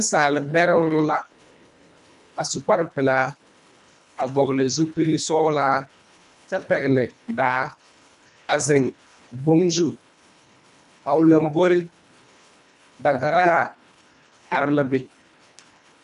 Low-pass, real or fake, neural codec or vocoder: 10.8 kHz; fake; codec, 24 kHz, 1.5 kbps, HILCodec